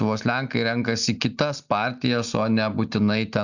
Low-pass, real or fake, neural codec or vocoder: 7.2 kHz; real; none